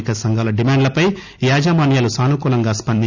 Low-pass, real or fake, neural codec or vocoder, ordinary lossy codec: 7.2 kHz; real; none; none